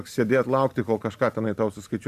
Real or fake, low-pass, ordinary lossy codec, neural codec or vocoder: real; 14.4 kHz; AAC, 96 kbps; none